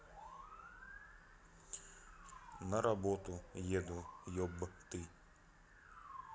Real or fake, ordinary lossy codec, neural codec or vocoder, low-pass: real; none; none; none